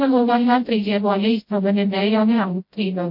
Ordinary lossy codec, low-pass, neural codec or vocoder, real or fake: MP3, 32 kbps; 5.4 kHz; codec, 16 kHz, 0.5 kbps, FreqCodec, smaller model; fake